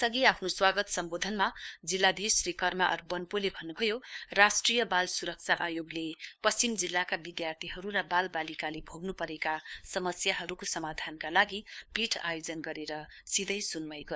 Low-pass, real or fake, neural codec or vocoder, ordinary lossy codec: none; fake; codec, 16 kHz, 2 kbps, FunCodec, trained on LibriTTS, 25 frames a second; none